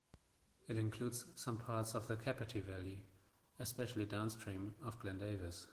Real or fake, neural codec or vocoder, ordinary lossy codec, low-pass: fake; autoencoder, 48 kHz, 128 numbers a frame, DAC-VAE, trained on Japanese speech; Opus, 16 kbps; 14.4 kHz